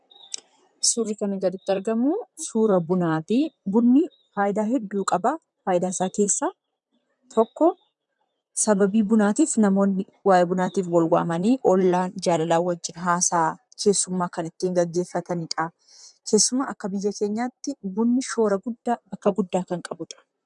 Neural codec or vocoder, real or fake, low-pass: vocoder, 44.1 kHz, 128 mel bands, Pupu-Vocoder; fake; 10.8 kHz